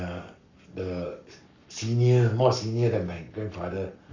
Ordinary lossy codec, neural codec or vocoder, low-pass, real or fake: none; codec, 44.1 kHz, 7.8 kbps, Pupu-Codec; 7.2 kHz; fake